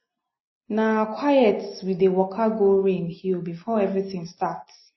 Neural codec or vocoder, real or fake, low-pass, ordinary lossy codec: none; real; 7.2 kHz; MP3, 24 kbps